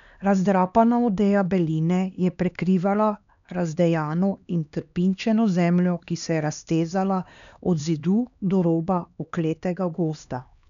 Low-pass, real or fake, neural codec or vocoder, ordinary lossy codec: 7.2 kHz; fake; codec, 16 kHz, 2 kbps, X-Codec, HuBERT features, trained on LibriSpeech; none